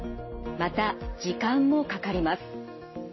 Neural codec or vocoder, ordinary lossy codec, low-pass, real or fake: none; MP3, 24 kbps; 7.2 kHz; real